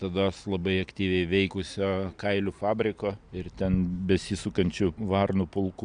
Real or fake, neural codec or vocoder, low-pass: real; none; 9.9 kHz